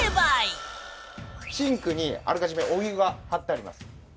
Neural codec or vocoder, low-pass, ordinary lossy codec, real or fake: none; none; none; real